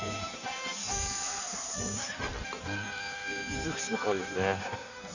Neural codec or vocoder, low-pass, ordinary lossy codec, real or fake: codec, 16 kHz in and 24 kHz out, 1.1 kbps, FireRedTTS-2 codec; 7.2 kHz; none; fake